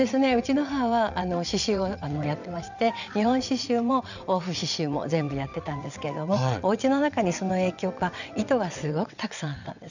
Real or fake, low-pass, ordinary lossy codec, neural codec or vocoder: fake; 7.2 kHz; none; vocoder, 22.05 kHz, 80 mel bands, WaveNeXt